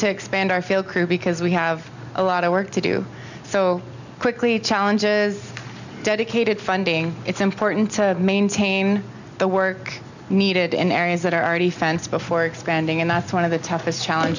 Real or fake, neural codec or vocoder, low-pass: real; none; 7.2 kHz